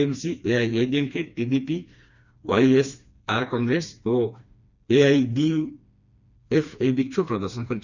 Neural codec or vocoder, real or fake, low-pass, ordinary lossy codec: codec, 16 kHz, 2 kbps, FreqCodec, smaller model; fake; 7.2 kHz; Opus, 64 kbps